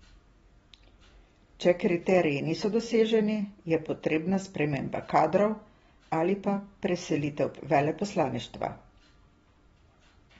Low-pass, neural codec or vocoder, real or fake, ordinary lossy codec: 19.8 kHz; none; real; AAC, 24 kbps